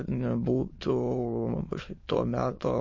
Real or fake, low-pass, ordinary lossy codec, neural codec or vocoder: fake; 7.2 kHz; MP3, 32 kbps; autoencoder, 22.05 kHz, a latent of 192 numbers a frame, VITS, trained on many speakers